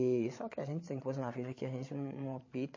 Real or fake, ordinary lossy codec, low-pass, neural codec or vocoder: fake; MP3, 32 kbps; 7.2 kHz; codec, 16 kHz, 8 kbps, FreqCodec, larger model